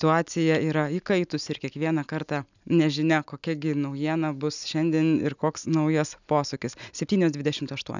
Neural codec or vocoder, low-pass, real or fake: none; 7.2 kHz; real